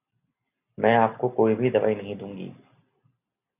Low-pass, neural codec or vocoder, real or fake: 3.6 kHz; none; real